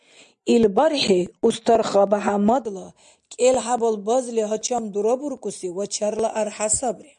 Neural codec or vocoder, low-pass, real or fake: none; 9.9 kHz; real